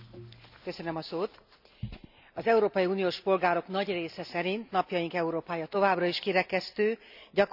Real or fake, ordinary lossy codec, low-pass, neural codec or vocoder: real; none; 5.4 kHz; none